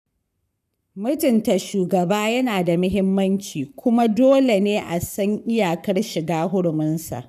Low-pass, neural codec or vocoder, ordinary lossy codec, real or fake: 14.4 kHz; codec, 44.1 kHz, 7.8 kbps, Pupu-Codec; none; fake